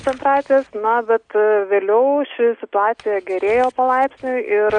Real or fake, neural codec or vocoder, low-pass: real; none; 9.9 kHz